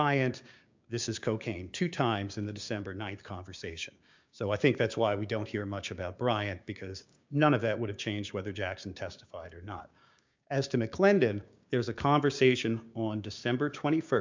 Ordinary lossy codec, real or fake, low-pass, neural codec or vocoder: MP3, 64 kbps; fake; 7.2 kHz; codec, 16 kHz, 6 kbps, DAC